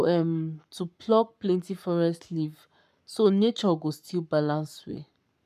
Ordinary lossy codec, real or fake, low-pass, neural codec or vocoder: none; fake; 14.4 kHz; codec, 44.1 kHz, 7.8 kbps, Pupu-Codec